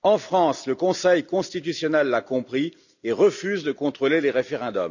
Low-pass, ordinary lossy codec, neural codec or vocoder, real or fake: 7.2 kHz; none; none; real